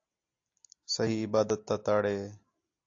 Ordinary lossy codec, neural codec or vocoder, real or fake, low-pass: AAC, 48 kbps; none; real; 7.2 kHz